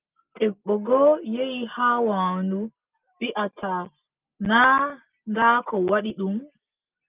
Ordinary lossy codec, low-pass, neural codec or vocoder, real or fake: Opus, 24 kbps; 3.6 kHz; none; real